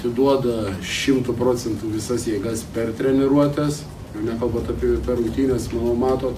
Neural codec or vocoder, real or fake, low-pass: none; real; 14.4 kHz